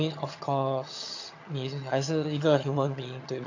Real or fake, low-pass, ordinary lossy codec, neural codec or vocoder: fake; 7.2 kHz; AAC, 48 kbps; vocoder, 22.05 kHz, 80 mel bands, HiFi-GAN